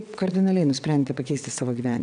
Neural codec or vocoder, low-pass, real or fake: vocoder, 22.05 kHz, 80 mel bands, WaveNeXt; 9.9 kHz; fake